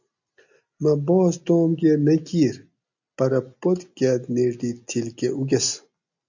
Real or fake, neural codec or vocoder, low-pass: real; none; 7.2 kHz